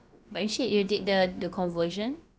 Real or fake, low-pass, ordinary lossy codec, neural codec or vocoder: fake; none; none; codec, 16 kHz, about 1 kbps, DyCAST, with the encoder's durations